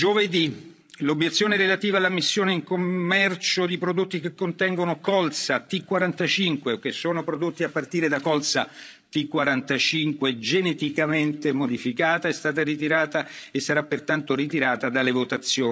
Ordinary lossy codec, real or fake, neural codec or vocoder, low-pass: none; fake; codec, 16 kHz, 16 kbps, FreqCodec, larger model; none